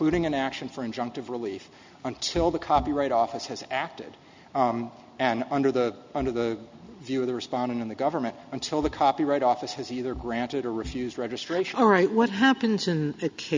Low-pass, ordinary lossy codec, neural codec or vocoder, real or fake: 7.2 kHz; MP3, 64 kbps; none; real